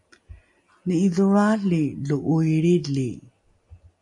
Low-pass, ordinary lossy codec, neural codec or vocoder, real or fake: 10.8 kHz; MP3, 64 kbps; none; real